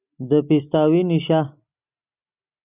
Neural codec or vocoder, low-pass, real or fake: none; 3.6 kHz; real